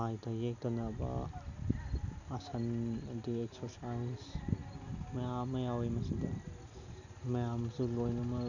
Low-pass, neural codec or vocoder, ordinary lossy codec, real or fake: 7.2 kHz; none; none; real